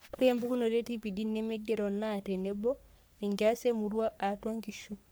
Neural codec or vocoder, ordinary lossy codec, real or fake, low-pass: codec, 44.1 kHz, 3.4 kbps, Pupu-Codec; none; fake; none